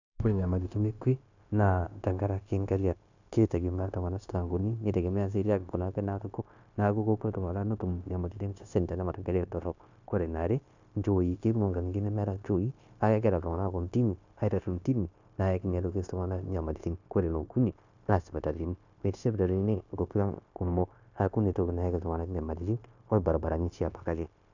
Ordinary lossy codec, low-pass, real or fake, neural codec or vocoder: none; 7.2 kHz; fake; codec, 16 kHz, 0.9 kbps, LongCat-Audio-Codec